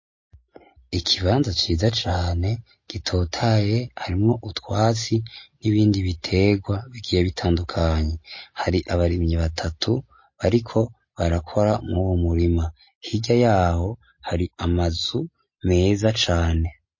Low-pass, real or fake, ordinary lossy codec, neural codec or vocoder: 7.2 kHz; real; MP3, 32 kbps; none